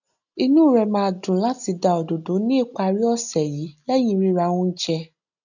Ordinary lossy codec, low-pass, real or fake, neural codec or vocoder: none; 7.2 kHz; real; none